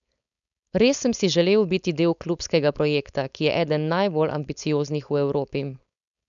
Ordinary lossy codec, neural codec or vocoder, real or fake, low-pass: none; codec, 16 kHz, 4.8 kbps, FACodec; fake; 7.2 kHz